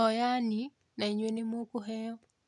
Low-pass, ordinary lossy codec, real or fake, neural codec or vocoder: 10.8 kHz; none; real; none